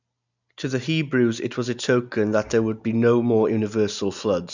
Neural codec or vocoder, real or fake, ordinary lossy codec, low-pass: none; real; none; 7.2 kHz